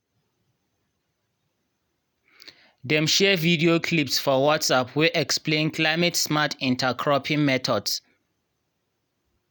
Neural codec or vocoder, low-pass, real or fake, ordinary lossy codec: none; none; real; none